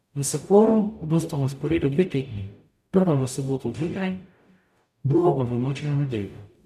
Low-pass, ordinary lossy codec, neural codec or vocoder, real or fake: 14.4 kHz; none; codec, 44.1 kHz, 0.9 kbps, DAC; fake